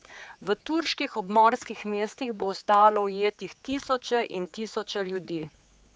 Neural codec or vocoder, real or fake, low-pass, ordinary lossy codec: codec, 16 kHz, 4 kbps, X-Codec, HuBERT features, trained on general audio; fake; none; none